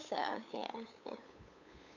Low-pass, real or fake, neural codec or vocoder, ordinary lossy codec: 7.2 kHz; fake; codec, 16 kHz, 8 kbps, FunCodec, trained on LibriTTS, 25 frames a second; none